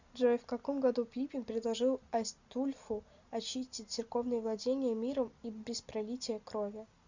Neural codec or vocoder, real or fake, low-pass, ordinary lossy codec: none; real; 7.2 kHz; Opus, 64 kbps